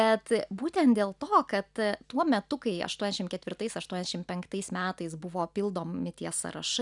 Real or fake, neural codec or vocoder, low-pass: real; none; 10.8 kHz